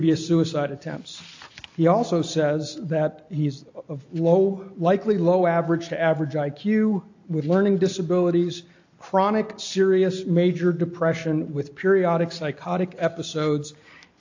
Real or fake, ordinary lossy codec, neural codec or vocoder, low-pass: real; AAC, 48 kbps; none; 7.2 kHz